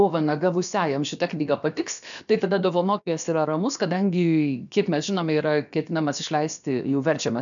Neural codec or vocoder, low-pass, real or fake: codec, 16 kHz, about 1 kbps, DyCAST, with the encoder's durations; 7.2 kHz; fake